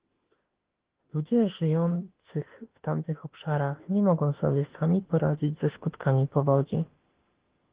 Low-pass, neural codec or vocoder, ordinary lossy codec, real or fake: 3.6 kHz; autoencoder, 48 kHz, 32 numbers a frame, DAC-VAE, trained on Japanese speech; Opus, 16 kbps; fake